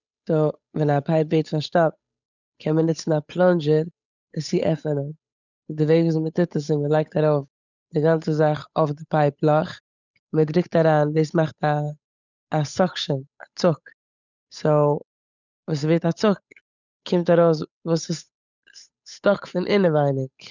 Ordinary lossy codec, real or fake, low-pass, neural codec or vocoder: none; fake; 7.2 kHz; codec, 16 kHz, 8 kbps, FunCodec, trained on Chinese and English, 25 frames a second